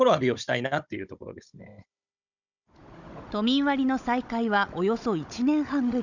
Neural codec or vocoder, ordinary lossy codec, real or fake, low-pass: codec, 16 kHz, 16 kbps, FunCodec, trained on Chinese and English, 50 frames a second; none; fake; 7.2 kHz